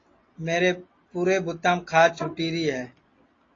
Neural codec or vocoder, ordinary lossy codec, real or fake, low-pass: none; AAC, 32 kbps; real; 7.2 kHz